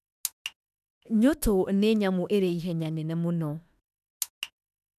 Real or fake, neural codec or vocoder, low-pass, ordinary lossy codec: fake; autoencoder, 48 kHz, 32 numbers a frame, DAC-VAE, trained on Japanese speech; 14.4 kHz; none